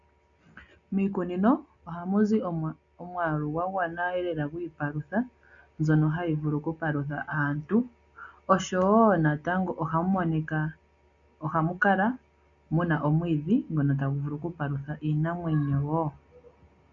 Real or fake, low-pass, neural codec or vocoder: real; 7.2 kHz; none